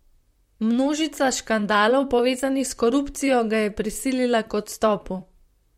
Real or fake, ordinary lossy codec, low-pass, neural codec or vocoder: fake; MP3, 64 kbps; 19.8 kHz; vocoder, 44.1 kHz, 128 mel bands, Pupu-Vocoder